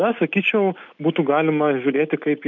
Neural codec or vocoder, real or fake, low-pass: none; real; 7.2 kHz